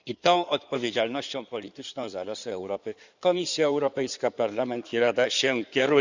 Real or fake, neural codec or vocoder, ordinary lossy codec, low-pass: fake; codec, 16 kHz in and 24 kHz out, 2.2 kbps, FireRedTTS-2 codec; Opus, 64 kbps; 7.2 kHz